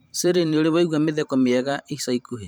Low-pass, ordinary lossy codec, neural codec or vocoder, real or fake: none; none; vocoder, 44.1 kHz, 128 mel bands every 512 samples, BigVGAN v2; fake